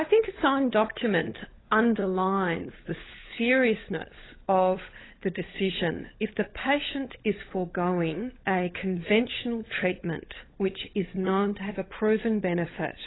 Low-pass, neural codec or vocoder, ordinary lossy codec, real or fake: 7.2 kHz; codec, 16 kHz, 16 kbps, FunCodec, trained on LibriTTS, 50 frames a second; AAC, 16 kbps; fake